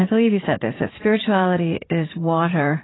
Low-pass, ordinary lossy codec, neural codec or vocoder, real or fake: 7.2 kHz; AAC, 16 kbps; vocoder, 44.1 kHz, 80 mel bands, Vocos; fake